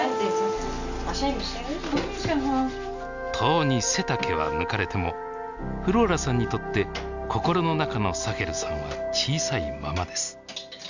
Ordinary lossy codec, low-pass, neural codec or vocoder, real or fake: none; 7.2 kHz; none; real